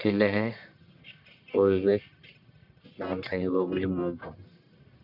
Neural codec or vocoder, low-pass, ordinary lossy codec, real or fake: codec, 44.1 kHz, 1.7 kbps, Pupu-Codec; 5.4 kHz; MP3, 48 kbps; fake